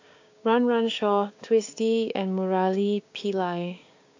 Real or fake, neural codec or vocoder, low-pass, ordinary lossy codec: fake; codec, 16 kHz, 6 kbps, DAC; 7.2 kHz; AAC, 48 kbps